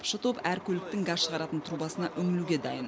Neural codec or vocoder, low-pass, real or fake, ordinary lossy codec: none; none; real; none